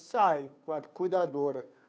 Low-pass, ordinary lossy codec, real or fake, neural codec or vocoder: none; none; fake; codec, 16 kHz, 2 kbps, FunCodec, trained on Chinese and English, 25 frames a second